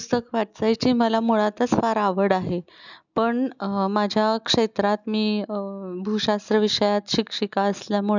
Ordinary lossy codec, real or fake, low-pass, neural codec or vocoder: none; real; 7.2 kHz; none